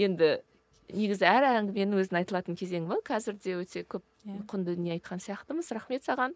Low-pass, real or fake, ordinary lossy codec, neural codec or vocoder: none; real; none; none